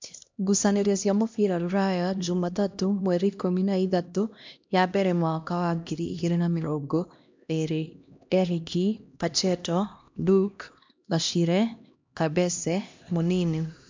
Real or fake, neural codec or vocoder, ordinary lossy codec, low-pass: fake; codec, 16 kHz, 1 kbps, X-Codec, HuBERT features, trained on LibriSpeech; MP3, 64 kbps; 7.2 kHz